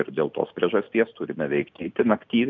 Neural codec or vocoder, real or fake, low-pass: none; real; 7.2 kHz